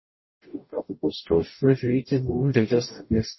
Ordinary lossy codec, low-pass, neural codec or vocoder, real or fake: MP3, 24 kbps; 7.2 kHz; codec, 44.1 kHz, 0.9 kbps, DAC; fake